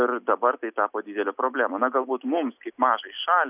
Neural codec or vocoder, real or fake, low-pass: none; real; 3.6 kHz